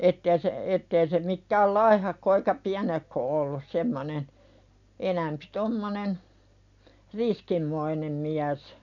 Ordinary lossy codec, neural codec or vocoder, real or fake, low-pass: none; none; real; 7.2 kHz